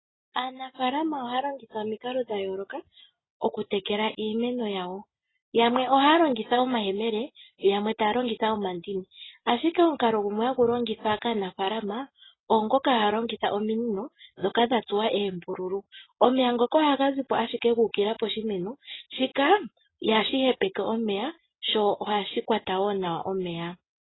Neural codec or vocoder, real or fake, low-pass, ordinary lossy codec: none; real; 7.2 kHz; AAC, 16 kbps